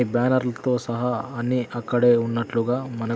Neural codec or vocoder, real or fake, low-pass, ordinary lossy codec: none; real; none; none